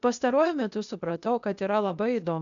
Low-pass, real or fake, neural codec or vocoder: 7.2 kHz; fake; codec, 16 kHz, 0.8 kbps, ZipCodec